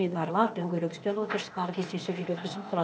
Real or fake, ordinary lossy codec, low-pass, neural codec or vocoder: fake; none; none; codec, 16 kHz, 0.8 kbps, ZipCodec